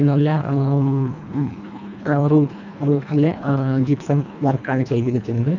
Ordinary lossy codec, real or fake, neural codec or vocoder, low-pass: none; fake; codec, 24 kHz, 1.5 kbps, HILCodec; 7.2 kHz